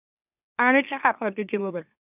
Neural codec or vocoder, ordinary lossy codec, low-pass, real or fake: autoencoder, 44.1 kHz, a latent of 192 numbers a frame, MeloTTS; none; 3.6 kHz; fake